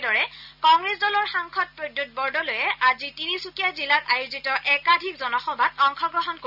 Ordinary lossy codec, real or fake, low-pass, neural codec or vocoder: none; real; 5.4 kHz; none